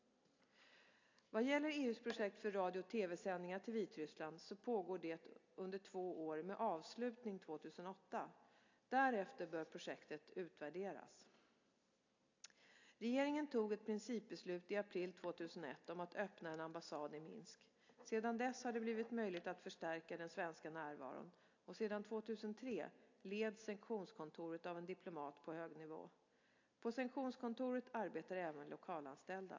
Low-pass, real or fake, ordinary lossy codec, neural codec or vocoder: 7.2 kHz; real; AAC, 48 kbps; none